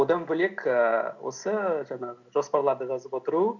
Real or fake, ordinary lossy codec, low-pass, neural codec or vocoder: real; none; 7.2 kHz; none